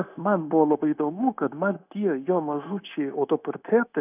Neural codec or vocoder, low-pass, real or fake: codec, 16 kHz, 0.9 kbps, LongCat-Audio-Codec; 3.6 kHz; fake